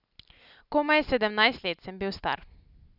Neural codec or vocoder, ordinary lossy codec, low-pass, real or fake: none; none; 5.4 kHz; real